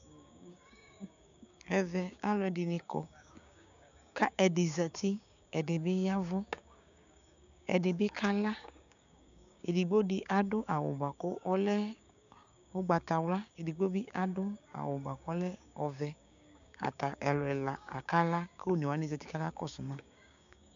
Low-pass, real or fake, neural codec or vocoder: 7.2 kHz; fake; codec, 16 kHz, 6 kbps, DAC